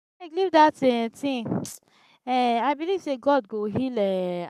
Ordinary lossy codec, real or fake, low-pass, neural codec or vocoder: none; fake; 14.4 kHz; codec, 44.1 kHz, 7.8 kbps, Pupu-Codec